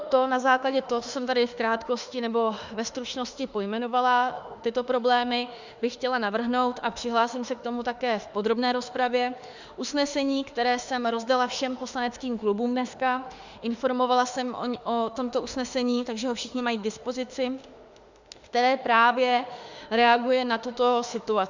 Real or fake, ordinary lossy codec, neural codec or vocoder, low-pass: fake; Opus, 64 kbps; autoencoder, 48 kHz, 32 numbers a frame, DAC-VAE, trained on Japanese speech; 7.2 kHz